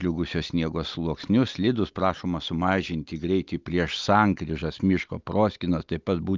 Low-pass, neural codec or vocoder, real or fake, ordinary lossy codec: 7.2 kHz; none; real; Opus, 24 kbps